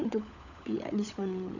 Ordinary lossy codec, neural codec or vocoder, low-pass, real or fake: none; codec, 16 kHz, 16 kbps, FunCodec, trained on LibriTTS, 50 frames a second; 7.2 kHz; fake